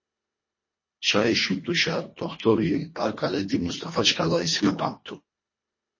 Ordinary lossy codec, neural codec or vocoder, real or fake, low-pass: MP3, 32 kbps; codec, 24 kHz, 1.5 kbps, HILCodec; fake; 7.2 kHz